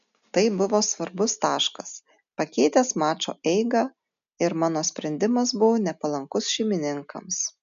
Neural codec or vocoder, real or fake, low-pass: none; real; 7.2 kHz